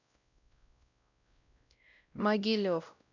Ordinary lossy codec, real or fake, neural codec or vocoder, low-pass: none; fake; codec, 16 kHz, 0.5 kbps, X-Codec, WavLM features, trained on Multilingual LibriSpeech; 7.2 kHz